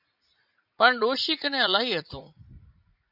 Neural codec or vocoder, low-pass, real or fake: vocoder, 44.1 kHz, 80 mel bands, Vocos; 5.4 kHz; fake